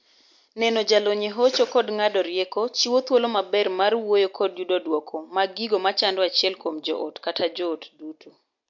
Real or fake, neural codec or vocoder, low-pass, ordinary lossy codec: real; none; 7.2 kHz; MP3, 48 kbps